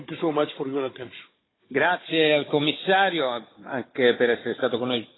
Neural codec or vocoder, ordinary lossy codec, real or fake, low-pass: codec, 16 kHz, 16 kbps, FunCodec, trained on Chinese and English, 50 frames a second; AAC, 16 kbps; fake; 7.2 kHz